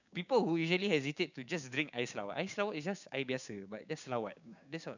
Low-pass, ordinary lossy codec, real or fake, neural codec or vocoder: 7.2 kHz; none; fake; codec, 16 kHz, 6 kbps, DAC